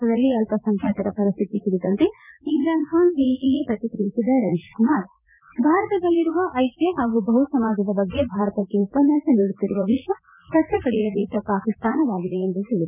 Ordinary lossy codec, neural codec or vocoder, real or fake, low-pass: none; vocoder, 44.1 kHz, 80 mel bands, Vocos; fake; 3.6 kHz